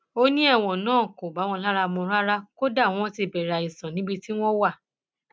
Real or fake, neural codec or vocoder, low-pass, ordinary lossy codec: real; none; none; none